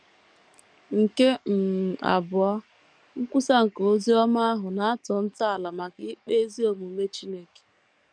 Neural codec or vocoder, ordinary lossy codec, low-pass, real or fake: codec, 44.1 kHz, 7.8 kbps, DAC; none; 9.9 kHz; fake